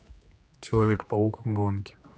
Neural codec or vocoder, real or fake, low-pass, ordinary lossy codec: codec, 16 kHz, 2 kbps, X-Codec, HuBERT features, trained on general audio; fake; none; none